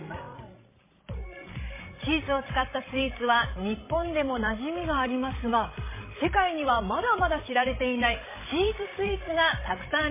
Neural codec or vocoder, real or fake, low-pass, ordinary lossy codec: codec, 16 kHz, 16 kbps, FreqCodec, larger model; fake; 3.6 kHz; MP3, 16 kbps